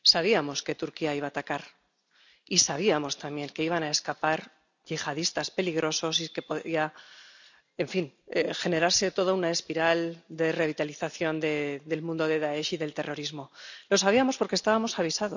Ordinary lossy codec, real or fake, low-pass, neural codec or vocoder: none; real; 7.2 kHz; none